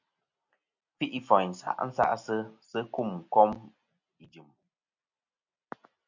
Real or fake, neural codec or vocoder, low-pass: real; none; 7.2 kHz